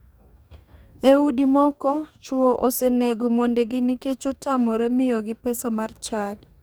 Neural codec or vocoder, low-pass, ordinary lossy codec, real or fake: codec, 44.1 kHz, 2.6 kbps, DAC; none; none; fake